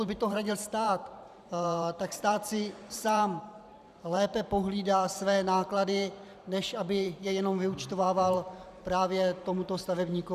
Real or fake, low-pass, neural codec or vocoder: fake; 14.4 kHz; vocoder, 44.1 kHz, 128 mel bands every 512 samples, BigVGAN v2